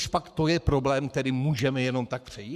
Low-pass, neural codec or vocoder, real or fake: 14.4 kHz; codec, 44.1 kHz, 7.8 kbps, Pupu-Codec; fake